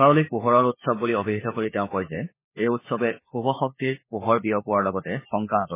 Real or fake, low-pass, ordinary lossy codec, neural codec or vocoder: fake; 3.6 kHz; MP3, 16 kbps; codec, 16 kHz, 4 kbps, FunCodec, trained on LibriTTS, 50 frames a second